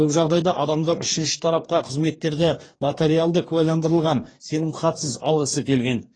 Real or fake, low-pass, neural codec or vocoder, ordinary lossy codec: fake; 9.9 kHz; codec, 44.1 kHz, 2.6 kbps, DAC; AAC, 32 kbps